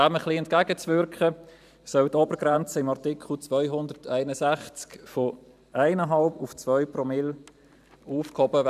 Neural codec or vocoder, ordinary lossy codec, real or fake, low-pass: vocoder, 44.1 kHz, 128 mel bands every 512 samples, BigVGAN v2; none; fake; 14.4 kHz